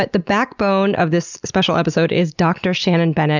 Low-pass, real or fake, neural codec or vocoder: 7.2 kHz; real; none